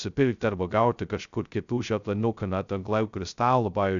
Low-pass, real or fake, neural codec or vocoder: 7.2 kHz; fake; codec, 16 kHz, 0.2 kbps, FocalCodec